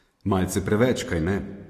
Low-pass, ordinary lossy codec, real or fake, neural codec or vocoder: 14.4 kHz; AAC, 48 kbps; real; none